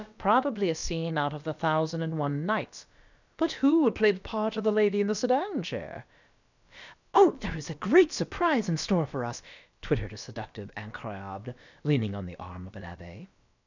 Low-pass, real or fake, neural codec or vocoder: 7.2 kHz; fake; codec, 16 kHz, about 1 kbps, DyCAST, with the encoder's durations